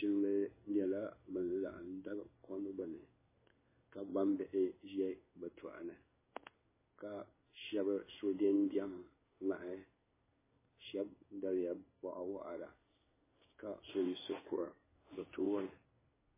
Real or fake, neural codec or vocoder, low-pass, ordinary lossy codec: fake; codec, 16 kHz in and 24 kHz out, 1 kbps, XY-Tokenizer; 3.6 kHz; MP3, 16 kbps